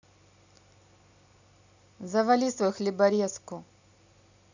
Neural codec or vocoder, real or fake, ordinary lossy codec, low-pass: none; real; none; 7.2 kHz